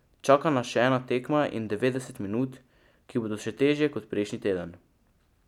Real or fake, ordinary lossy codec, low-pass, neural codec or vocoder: real; none; 19.8 kHz; none